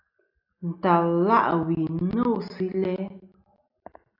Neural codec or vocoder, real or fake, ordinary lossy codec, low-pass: none; real; AAC, 48 kbps; 5.4 kHz